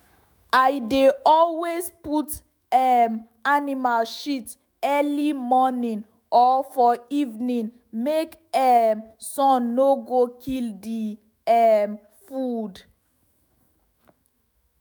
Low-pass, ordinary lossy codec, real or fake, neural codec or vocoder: none; none; fake; autoencoder, 48 kHz, 128 numbers a frame, DAC-VAE, trained on Japanese speech